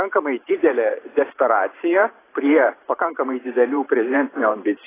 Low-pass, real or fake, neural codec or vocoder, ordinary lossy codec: 3.6 kHz; fake; vocoder, 44.1 kHz, 128 mel bands every 512 samples, BigVGAN v2; AAC, 16 kbps